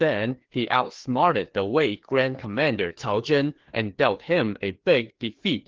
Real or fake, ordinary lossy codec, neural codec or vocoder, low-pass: fake; Opus, 16 kbps; codec, 16 kHz, 2 kbps, FreqCodec, larger model; 7.2 kHz